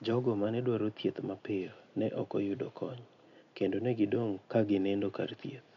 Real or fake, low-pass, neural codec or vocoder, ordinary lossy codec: real; 7.2 kHz; none; none